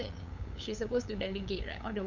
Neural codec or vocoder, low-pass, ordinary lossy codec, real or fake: codec, 16 kHz, 8 kbps, FunCodec, trained on LibriTTS, 25 frames a second; 7.2 kHz; none; fake